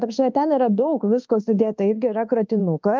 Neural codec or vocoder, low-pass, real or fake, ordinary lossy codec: codec, 24 kHz, 1.2 kbps, DualCodec; 7.2 kHz; fake; Opus, 32 kbps